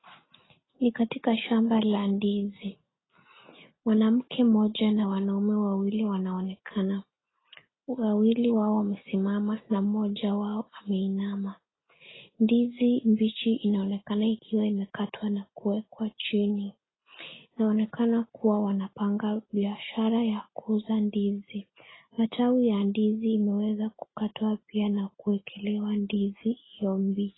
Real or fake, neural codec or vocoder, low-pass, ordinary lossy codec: real; none; 7.2 kHz; AAC, 16 kbps